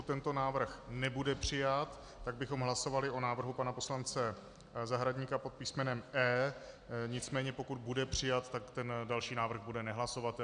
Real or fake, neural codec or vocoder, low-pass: real; none; 9.9 kHz